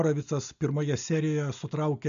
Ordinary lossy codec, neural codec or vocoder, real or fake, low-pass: AAC, 96 kbps; none; real; 7.2 kHz